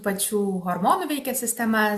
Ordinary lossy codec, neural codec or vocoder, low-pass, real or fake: AAC, 96 kbps; none; 14.4 kHz; real